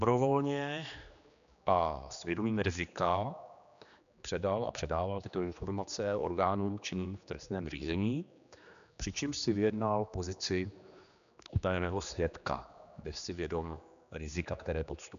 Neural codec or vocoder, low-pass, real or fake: codec, 16 kHz, 2 kbps, X-Codec, HuBERT features, trained on general audio; 7.2 kHz; fake